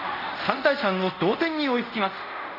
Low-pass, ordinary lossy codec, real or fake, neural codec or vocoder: 5.4 kHz; none; fake; codec, 24 kHz, 0.5 kbps, DualCodec